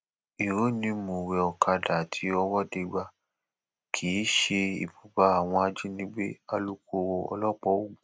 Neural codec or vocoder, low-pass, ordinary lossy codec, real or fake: none; none; none; real